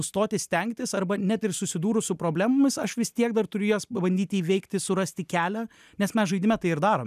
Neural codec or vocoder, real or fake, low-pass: none; real; 14.4 kHz